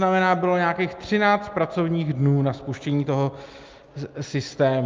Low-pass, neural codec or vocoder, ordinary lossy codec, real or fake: 7.2 kHz; none; Opus, 24 kbps; real